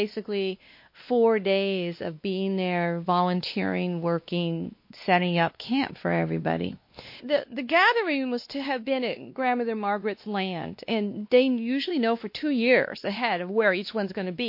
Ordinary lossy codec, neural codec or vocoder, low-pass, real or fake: MP3, 32 kbps; codec, 16 kHz, 2 kbps, X-Codec, WavLM features, trained on Multilingual LibriSpeech; 5.4 kHz; fake